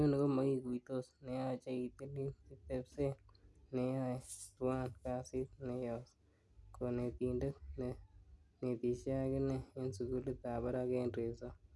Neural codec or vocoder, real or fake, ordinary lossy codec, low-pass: none; real; none; none